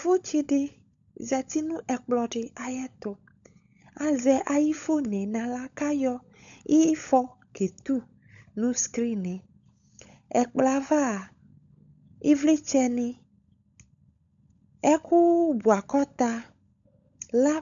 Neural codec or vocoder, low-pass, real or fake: codec, 16 kHz, 16 kbps, FunCodec, trained on LibriTTS, 50 frames a second; 7.2 kHz; fake